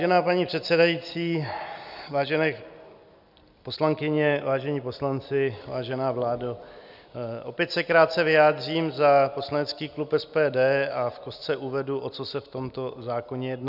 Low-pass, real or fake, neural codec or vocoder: 5.4 kHz; real; none